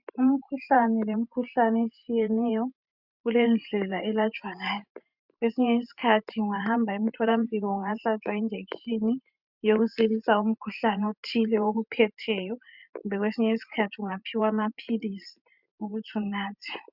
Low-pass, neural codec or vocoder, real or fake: 5.4 kHz; vocoder, 44.1 kHz, 128 mel bands every 512 samples, BigVGAN v2; fake